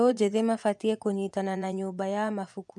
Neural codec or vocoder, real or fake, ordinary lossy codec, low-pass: vocoder, 24 kHz, 100 mel bands, Vocos; fake; none; none